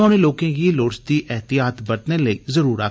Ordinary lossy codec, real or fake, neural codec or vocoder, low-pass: none; real; none; none